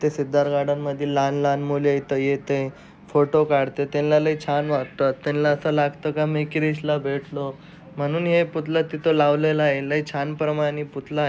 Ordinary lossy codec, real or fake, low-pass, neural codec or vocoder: none; real; none; none